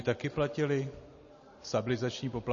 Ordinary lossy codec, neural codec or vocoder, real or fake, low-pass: MP3, 32 kbps; none; real; 7.2 kHz